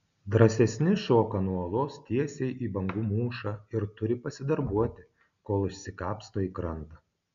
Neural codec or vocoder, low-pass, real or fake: none; 7.2 kHz; real